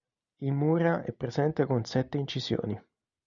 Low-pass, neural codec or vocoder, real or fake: 7.2 kHz; none; real